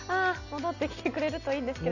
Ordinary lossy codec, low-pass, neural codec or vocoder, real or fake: none; 7.2 kHz; none; real